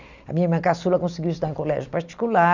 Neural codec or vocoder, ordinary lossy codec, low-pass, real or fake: none; none; 7.2 kHz; real